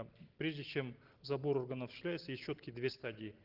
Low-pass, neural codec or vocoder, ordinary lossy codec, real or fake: 5.4 kHz; none; Opus, 16 kbps; real